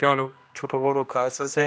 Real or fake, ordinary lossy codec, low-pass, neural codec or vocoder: fake; none; none; codec, 16 kHz, 1 kbps, X-Codec, HuBERT features, trained on general audio